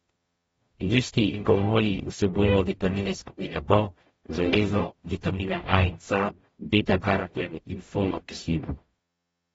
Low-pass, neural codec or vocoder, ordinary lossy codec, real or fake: 19.8 kHz; codec, 44.1 kHz, 0.9 kbps, DAC; AAC, 24 kbps; fake